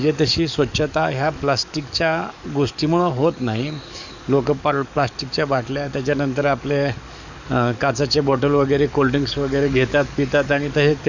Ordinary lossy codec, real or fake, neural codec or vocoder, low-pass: none; fake; codec, 44.1 kHz, 7.8 kbps, DAC; 7.2 kHz